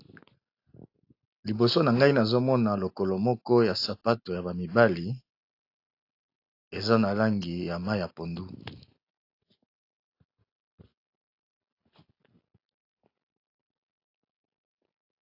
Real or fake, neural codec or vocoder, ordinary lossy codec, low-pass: real; none; AAC, 32 kbps; 5.4 kHz